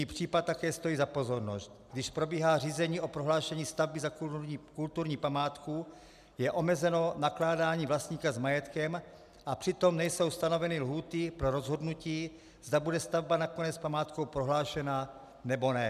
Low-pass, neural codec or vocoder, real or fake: 14.4 kHz; none; real